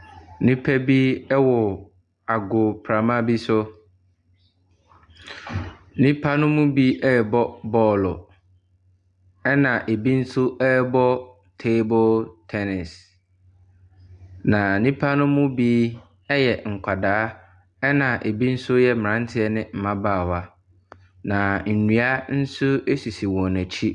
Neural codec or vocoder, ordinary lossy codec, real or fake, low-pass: none; Opus, 64 kbps; real; 10.8 kHz